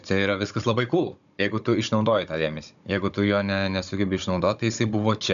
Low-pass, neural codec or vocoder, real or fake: 7.2 kHz; codec, 16 kHz, 16 kbps, FunCodec, trained on Chinese and English, 50 frames a second; fake